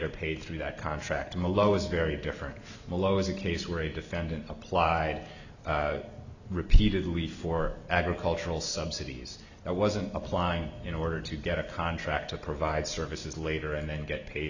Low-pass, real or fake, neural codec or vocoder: 7.2 kHz; real; none